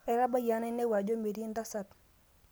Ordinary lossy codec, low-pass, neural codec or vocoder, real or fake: none; none; none; real